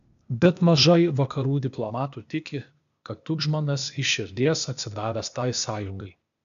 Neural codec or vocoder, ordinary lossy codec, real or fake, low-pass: codec, 16 kHz, 0.8 kbps, ZipCodec; AAC, 96 kbps; fake; 7.2 kHz